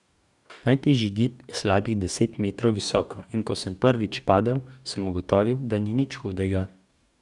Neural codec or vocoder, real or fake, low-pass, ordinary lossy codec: codec, 44.1 kHz, 2.6 kbps, DAC; fake; 10.8 kHz; none